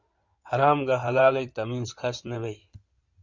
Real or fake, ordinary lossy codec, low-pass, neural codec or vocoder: fake; Opus, 64 kbps; 7.2 kHz; codec, 16 kHz in and 24 kHz out, 2.2 kbps, FireRedTTS-2 codec